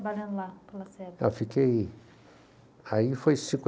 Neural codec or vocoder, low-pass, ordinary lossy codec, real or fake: none; none; none; real